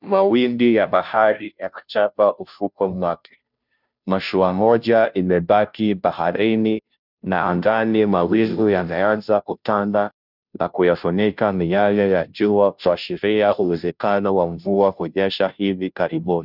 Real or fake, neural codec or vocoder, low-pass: fake; codec, 16 kHz, 0.5 kbps, FunCodec, trained on Chinese and English, 25 frames a second; 5.4 kHz